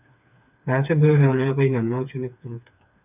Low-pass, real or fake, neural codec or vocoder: 3.6 kHz; fake; codec, 16 kHz, 4 kbps, FreqCodec, smaller model